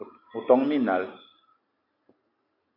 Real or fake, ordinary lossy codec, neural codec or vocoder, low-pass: real; AAC, 32 kbps; none; 5.4 kHz